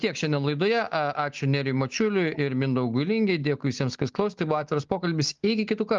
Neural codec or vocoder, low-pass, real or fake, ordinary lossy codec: none; 7.2 kHz; real; Opus, 32 kbps